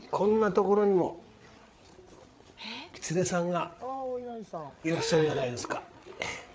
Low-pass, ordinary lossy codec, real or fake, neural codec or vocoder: none; none; fake; codec, 16 kHz, 8 kbps, FreqCodec, larger model